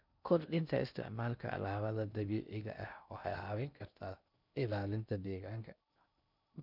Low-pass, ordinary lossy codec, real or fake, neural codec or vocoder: 5.4 kHz; none; fake; codec, 16 kHz in and 24 kHz out, 0.6 kbps, FocalCodec, streaming, 2048 codes